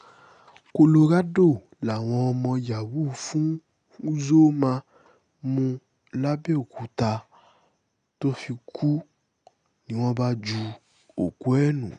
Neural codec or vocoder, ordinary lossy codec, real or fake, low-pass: none; none; real; 9.9 kHz